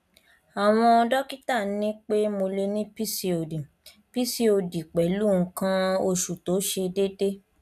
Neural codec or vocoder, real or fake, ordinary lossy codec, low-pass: none; real; none; 14.4 kHz